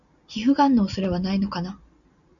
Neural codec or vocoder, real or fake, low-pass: none; real; 7.2 kHz